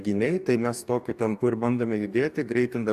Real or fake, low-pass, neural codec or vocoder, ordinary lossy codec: fake; 14.4 kHz; codec, 44.1 kHz, 2.6 kbps, DAC; AAC, 96 kbps